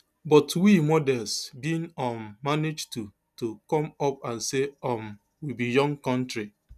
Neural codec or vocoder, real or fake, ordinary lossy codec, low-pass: none; real; none; 14.4 kHz